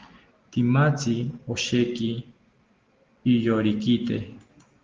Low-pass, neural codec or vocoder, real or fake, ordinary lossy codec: 7.2 kHz; none; real; Opus, 16 kbps